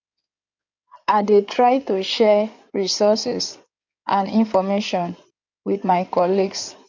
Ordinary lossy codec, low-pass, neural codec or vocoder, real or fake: none; 7.2 kHz; codec, 16 kHz in and 24 kHz out, 2.2 kbps, FireRedTTS-2 codec; fake